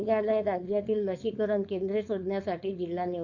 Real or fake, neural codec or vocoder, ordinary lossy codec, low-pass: fake; codec, 16 kHz, 4.8 kbps, FACodec; Opus, 64 kbps; 7.2 kHz